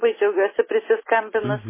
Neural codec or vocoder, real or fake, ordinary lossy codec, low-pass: none; real; MP3, 16 kbps; 3.6 kHz